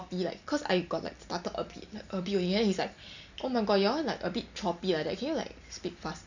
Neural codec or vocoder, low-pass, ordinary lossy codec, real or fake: none; 7.2 kHz; none; real